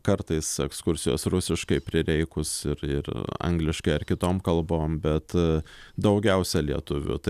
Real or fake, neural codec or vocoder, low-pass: real; none; 14.4 kHz